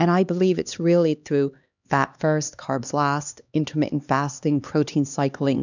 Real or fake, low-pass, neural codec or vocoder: fake; 7.2 kHz; codec, 16 kHz, 2 kbps, X-Codec, HuBERT features, trained on LibriSpeech